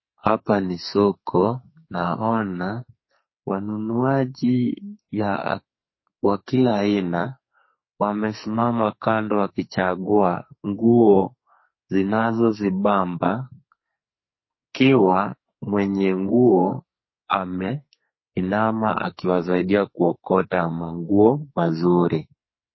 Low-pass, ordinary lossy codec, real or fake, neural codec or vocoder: 7.2 kHz; MP3, 24 kbps; fake; codec, 44.1 kHz, 2.6 kbps, SNAC